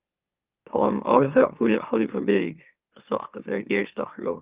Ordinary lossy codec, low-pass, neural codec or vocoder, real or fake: Opus, 32 kbps; 3.6 kHz; autoencoder, 44.1 kHz, a latent of 192 numbers a frame, MeloTTS; fake